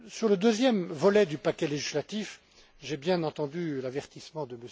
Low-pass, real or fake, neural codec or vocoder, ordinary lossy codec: none; real; none; none